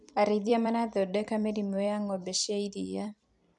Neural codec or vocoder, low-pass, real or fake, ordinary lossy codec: vocoder, 44.1 kHz, 128 mel bands every 256 samples, BigVGAN v2; 10.8 kHz; fake; none